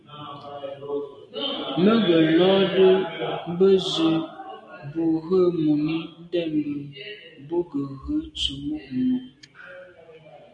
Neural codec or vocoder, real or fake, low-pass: none; real; 9.9 kHz